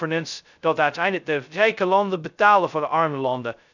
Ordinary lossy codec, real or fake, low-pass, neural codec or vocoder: none; fake; 7.2 kHz; codec, 16 kHz, 0.2 kbps, FocalCodec